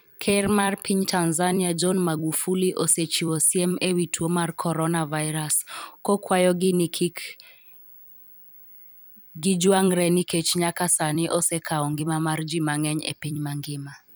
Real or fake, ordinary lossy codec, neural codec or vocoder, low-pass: fake; none; vocoder, 44.1 kHz, 128 mel bands every 256 samples, BigVGAN v2; none